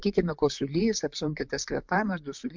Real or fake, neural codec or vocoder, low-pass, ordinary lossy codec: fake; vocoder, 44.1 kHz, 128 mel bands, Pupu-Vocoder; 7.2 kHz; MP3, 64 kbps